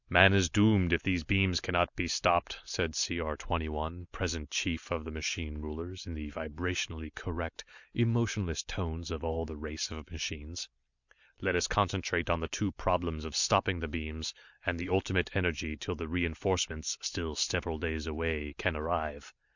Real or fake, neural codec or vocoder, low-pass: real; none; 7.2 kHz